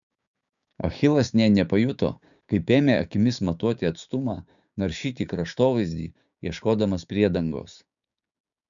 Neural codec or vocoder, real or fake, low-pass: codec, 16 kHz, 6 kbps, DAC; fake; 7.2 kHz